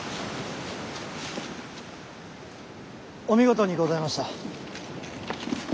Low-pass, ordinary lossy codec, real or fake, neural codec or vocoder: none; none; real; none